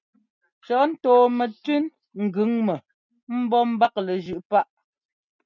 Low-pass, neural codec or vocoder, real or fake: 7.2 kHz; none; real